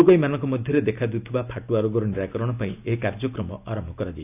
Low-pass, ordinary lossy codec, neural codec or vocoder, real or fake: 3.6 kHz; none; none; real